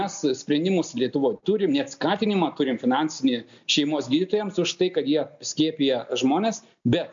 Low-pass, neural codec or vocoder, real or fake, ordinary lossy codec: 7.2 kHz; none; real; MP3, 64 kbps